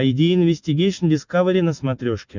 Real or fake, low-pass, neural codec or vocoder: real; 7.2 kHz; none